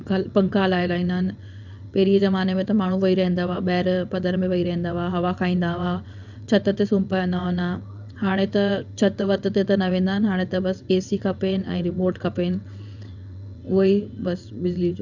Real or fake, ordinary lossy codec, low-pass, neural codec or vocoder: fake; none; 7.2 kHz; vocoder, 22.05 kHz, 80 mel bands, WaveNeXt